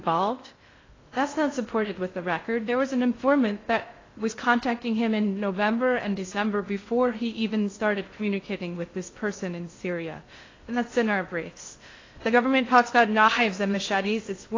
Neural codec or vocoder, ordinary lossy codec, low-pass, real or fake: codec, 16 kHz in and 24 kHz out, 0.6 kbps, FocalCodec, streaming, 2048 codes; AAC, 32 kbps; 7.2 kHz; fake